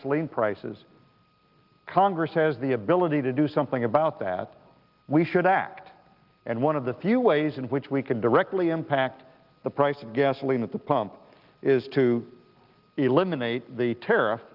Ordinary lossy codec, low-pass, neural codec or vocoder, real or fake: Opus, 32 kbps; 5.4 kHz; none; real